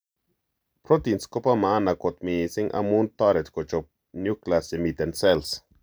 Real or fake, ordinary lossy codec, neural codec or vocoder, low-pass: real; none; none; none